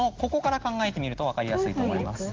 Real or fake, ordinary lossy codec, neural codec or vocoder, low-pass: real; Opus, 16 kbps; none; 7.2 kHz